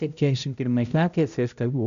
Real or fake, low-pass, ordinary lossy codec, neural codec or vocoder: fake; 7.2 kHz; AAC, 96 kbps; codec, 16 kHz, 0.5 kbps, X-Codec, HuBERT features, trained on balanced general audio